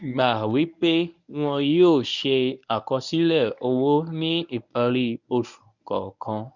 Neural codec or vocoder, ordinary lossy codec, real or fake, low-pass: codec, 24 kHz, 0.9 kbps, WavTokenizer, medium speech release version 1; none; fake; 7.2 kHz